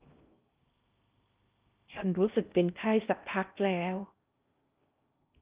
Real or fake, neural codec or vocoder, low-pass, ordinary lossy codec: fake; codec, 16 kHz in and 24 kHz out, 0.6 kbps, FocalCodec, streaming, 2048 codes; 3.6 kHz; Opus, 32 kbps